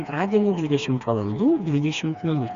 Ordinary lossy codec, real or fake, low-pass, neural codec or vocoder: Opus, 64 kbps; fake; 7.2 kHz; codec, 16 kHz, 2 kbps, FreqCodec, smaller model